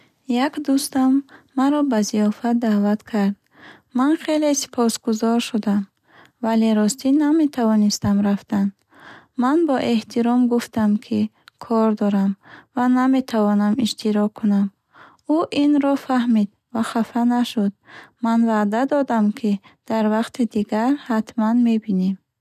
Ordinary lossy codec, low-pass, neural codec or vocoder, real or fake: none; 14.4 kHz; none; real